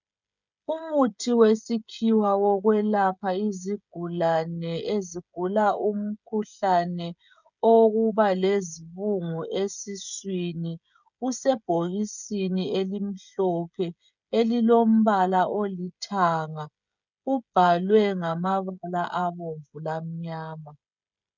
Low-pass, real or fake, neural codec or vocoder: 7.2 kHz; fake; codec, 16 kHz, 16 kbps, FreqCodec, smaller model